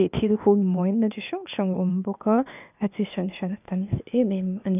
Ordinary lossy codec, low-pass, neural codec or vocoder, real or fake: none; 3.6 kHz; codec, 16 kHz, 0.8 kbps, ZipCodec; fake